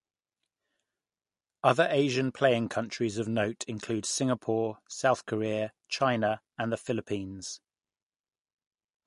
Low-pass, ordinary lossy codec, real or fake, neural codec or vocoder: 14.4 kHz; MP3, 48 kbps; real; none